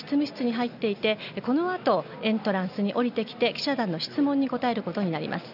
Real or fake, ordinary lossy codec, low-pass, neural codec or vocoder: real; none; 5.4 kHz; none